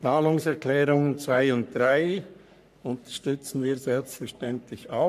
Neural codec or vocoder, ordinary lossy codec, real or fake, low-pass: codec, 44.1 kHz, 3.4 kbps, Pupu-Codec; none; fake; 14.4 kHz